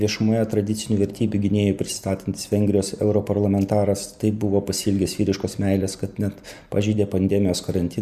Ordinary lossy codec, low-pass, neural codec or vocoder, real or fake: Opus, 64 kbps; 14.4 kHz; none; real